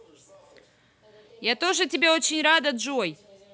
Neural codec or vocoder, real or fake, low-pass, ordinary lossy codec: none; real; none; none